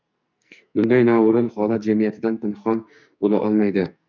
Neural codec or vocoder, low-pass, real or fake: codec, 44.1 kHz, 2.6 kbps, SNAC; 7.2 kHz; fake